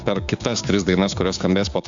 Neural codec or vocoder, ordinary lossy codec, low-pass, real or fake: codec, 16 kHz, 6 kbps, DAC; AAC, 64 kbps; 7.2 kHz; fake